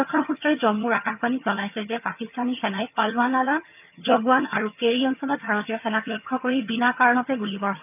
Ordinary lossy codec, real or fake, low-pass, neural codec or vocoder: none; fake; 3.6 kHz; vocoder, 22.05 kHz, 80 mel bands, HiFi-GAN